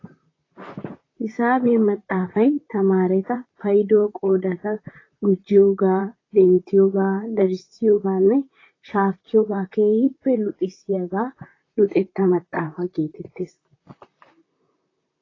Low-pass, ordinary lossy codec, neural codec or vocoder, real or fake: 7.2 kHz; AAC, 32 kbps; vocoder, 44.1 kHz, 128 mel bands, Pupu-Vocoder; fake